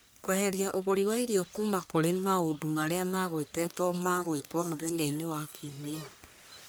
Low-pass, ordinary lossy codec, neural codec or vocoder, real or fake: none; none; codec, 44.1 kHz, 1.7 kbps, Pupu-Codec; fake